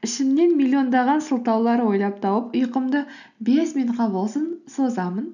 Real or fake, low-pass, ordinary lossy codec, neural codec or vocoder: real; 7.2 kHz; none; none